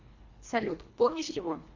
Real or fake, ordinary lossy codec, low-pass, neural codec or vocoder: fake; MP3, 64 kbps; 7.2 kHz; codec, 24 kHz, 1.5 kbps, HILCodec